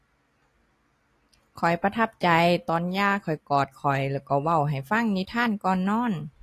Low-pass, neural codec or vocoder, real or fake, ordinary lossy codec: 14.4 kHz; none; real; AAC, 48 kbps